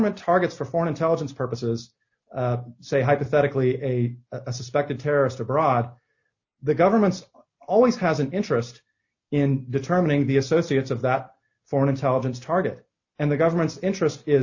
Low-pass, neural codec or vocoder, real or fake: 7.2 kHz; none; real